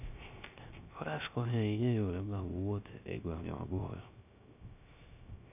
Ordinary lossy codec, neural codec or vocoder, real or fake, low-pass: none; codec, 16 kHz, 0.3 kbps, FocalCodec; fake; 3.6 kHz